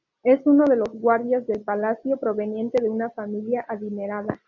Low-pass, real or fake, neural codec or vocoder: 7.2 kHz; real; none